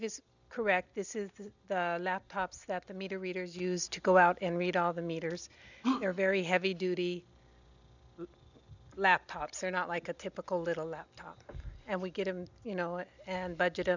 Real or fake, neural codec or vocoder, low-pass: real; none; 7.2 kHz